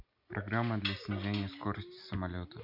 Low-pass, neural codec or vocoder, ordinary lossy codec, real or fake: 5.4 kHz; none; none; real